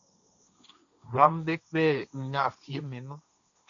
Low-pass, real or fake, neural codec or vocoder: 7.2 kHz; fake; codec, 16 kHz, 1.1 kbps, Voila-Tokenizer